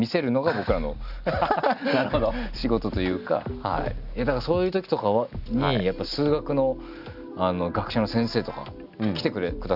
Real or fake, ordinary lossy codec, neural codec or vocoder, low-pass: real; none; none; 5.4 kHz